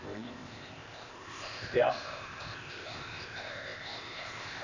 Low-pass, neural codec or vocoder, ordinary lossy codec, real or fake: 7.2 kHz; codec, 16 kHz, 0.8 kbps, ZipCodec; none; fake